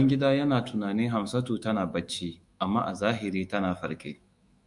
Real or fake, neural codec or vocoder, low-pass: fake; autoencoder, 48 kHz, 128 numbers a frame, DAC-VAE, trained on Japanese speech; 10.8 kHz